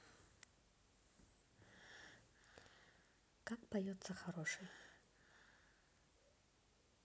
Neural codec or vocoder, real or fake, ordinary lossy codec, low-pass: none; real; none; none